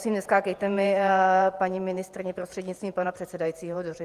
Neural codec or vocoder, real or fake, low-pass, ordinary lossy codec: vocoder, 48 kHz, 128 mel bands, Vocos; fake; 14.4 kHz; Opus, 32 kbps